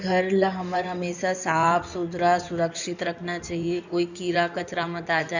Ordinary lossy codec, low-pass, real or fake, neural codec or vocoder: none; 7.2 kHz; fake; codec, 16 kHz in and 24 kHz out, 2.2 kbps, FireRedTTS-2 codec